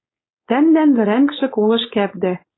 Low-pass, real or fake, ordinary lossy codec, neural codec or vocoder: 7.2 kHz; fake; AAC, 16 kbps; codec, 16 kHz, 4.8 kbps, FACodec